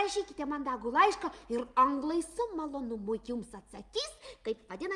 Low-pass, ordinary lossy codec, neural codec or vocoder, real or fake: 10.8 kHz; Opus, 32 kbps; none; real